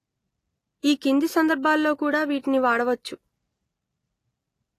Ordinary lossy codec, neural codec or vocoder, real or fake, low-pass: AAC, 48 kbps; none; real; 14.4 kHz